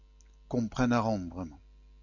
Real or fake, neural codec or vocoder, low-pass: real; none; 7.2 kHz